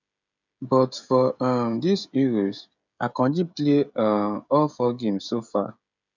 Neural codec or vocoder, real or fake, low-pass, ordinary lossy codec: codec, 16 kHz, 16 kbps, FreqCodec, smaller model; fake; 7.2 kHz; none